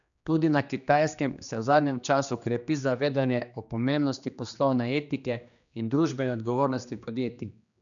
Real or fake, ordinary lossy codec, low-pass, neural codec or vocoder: fake; none; 7.2 kHz; codec, 16 kHz, 2 kbps, X-Codec, HuBERT features, trained on general audio